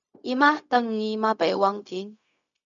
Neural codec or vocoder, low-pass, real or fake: codec, 16 kHz, 0.4 kbps, LongCat-Audio-Codec; 7.2 kHz; fake